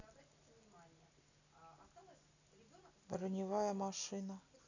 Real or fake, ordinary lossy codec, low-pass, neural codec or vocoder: real; none; 7.2 kHz; none